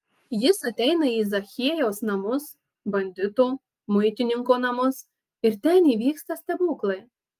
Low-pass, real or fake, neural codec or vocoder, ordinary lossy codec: 14.4 kHz; real; none; Opus, 32 kbps